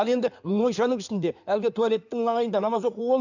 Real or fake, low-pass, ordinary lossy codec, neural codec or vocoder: fake; 7.2 kHz; none; codec, 16 kHz in and 24 kHz out, 2.2 kbps, FireRedTTS-2 codec